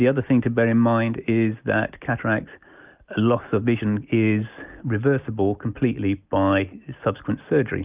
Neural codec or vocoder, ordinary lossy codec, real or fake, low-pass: none; Opus, 24 kbps; real; 3.6 kHz